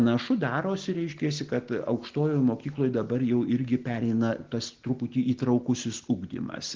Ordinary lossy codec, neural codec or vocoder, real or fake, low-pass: Opus, 16 kbps; none; real; 7.2 kHz